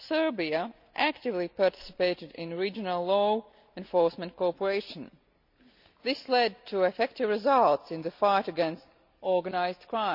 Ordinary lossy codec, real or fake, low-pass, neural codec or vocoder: none; real; 5.4 kHz; none